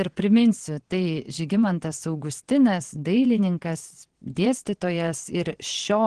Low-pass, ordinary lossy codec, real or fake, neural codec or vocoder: 9.9 kHz; Opus, 16 kbps; fake; vocoder, 22.05 kHz, 80 mel bands, WaveNeXt